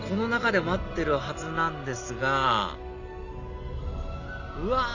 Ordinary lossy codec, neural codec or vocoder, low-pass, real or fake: none; none; 7.2 kHz; real